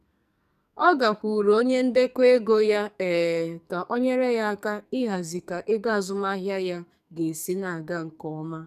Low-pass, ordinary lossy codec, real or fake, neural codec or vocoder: 14.4 kHz; none; fake; codec, 44.1 kHz, 2.6 kbps, SNAC